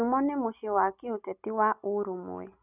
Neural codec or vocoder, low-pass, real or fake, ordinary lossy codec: none; 3.6 kHz; real; none